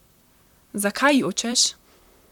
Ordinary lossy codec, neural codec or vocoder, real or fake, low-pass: none; vocoder, 44.1 kHz, 128 mel bands, Pupu-Vocoder; fake; 19.8 kHz